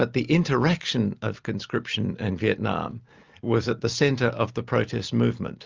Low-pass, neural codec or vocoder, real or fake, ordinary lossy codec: 7.2 kHz; none; real; Opus, 32 kbps